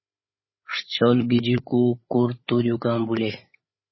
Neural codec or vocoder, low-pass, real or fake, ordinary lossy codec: codec, 16 kHz, 8 kbps, FreqCodec, larger model; 7.2 kHz; fake; MP3, 24 kbps